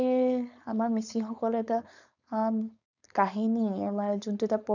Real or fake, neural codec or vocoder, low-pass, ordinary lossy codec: fake; codec, 16 kHz, 4.8 kbps, FACodec; 7.2 kHz; none